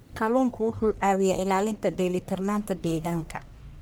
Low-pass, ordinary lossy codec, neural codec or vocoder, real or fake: none; none; codec, 44.1 kHz, 1.7 kbps, Pupu-Codec; fake